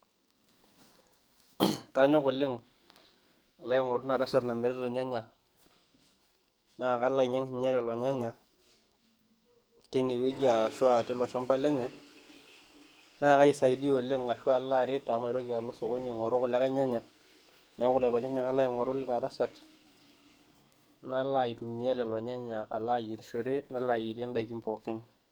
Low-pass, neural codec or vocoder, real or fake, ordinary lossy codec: none; codec, 44.1 kHz, 2.6 kbps, SNAC; fake; none